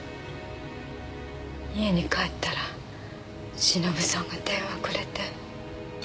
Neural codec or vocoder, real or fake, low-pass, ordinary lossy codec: none; real; none; none